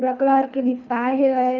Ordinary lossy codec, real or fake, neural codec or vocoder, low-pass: none; fake; codec, 24 kHz, 3 kbps, HILCodec; 7.2 kHz